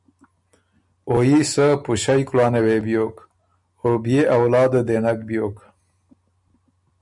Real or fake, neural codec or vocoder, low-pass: real; none; 10.8 kHz